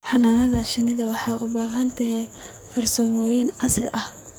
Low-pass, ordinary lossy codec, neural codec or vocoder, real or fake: none; none; codec, 44.1 kHz, 2.6 kbps, SNAC; fake